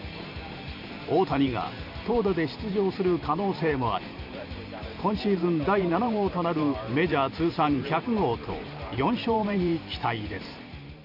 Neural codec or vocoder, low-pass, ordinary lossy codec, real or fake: vocoder, 44.1 kHz, 128 mel bands every 512 samples, BigVGAN v2; 5.4 kHz; none; fake